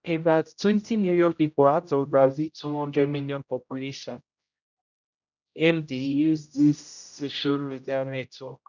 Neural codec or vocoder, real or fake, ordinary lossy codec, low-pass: codec, 16 kHz, 0.5 kbps, X-Codec, HuBERT features, trained on general audio; fake; none; 7.2 kHz